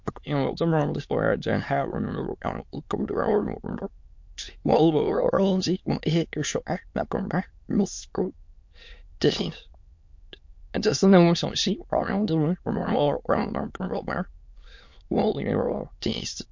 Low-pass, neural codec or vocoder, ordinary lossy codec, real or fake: 7.2 kHz; autoencoder, 22.05 kHz, a latent of 192 numbers a frame, VITS, trained on many speakers; MP3, 48 kbps; fake